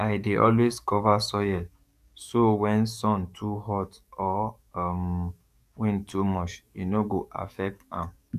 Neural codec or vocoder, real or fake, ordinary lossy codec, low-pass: codec, 44.1 kHz, 7.8 kbps, DAC; fake; none; 14.4 kHz